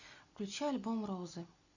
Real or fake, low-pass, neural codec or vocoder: real; 7.2 kHz; none